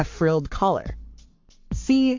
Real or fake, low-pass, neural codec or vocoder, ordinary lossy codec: fake; 7.2 kHz; codec, 44.1 kHz, 7.8 kbps, Pupu-Codec; MP3, 48 kbps